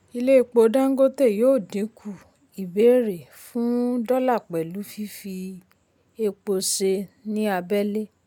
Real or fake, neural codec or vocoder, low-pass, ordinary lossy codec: real; none; 19.8 kHz; none